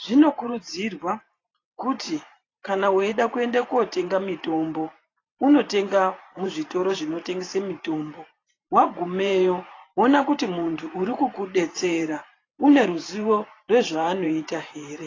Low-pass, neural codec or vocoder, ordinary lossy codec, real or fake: 7.2 kHz; vocoder, 44.1 kHz, 128 mel bands every 512 samples, BigVGAN v2; AAC, 32 kbps; fake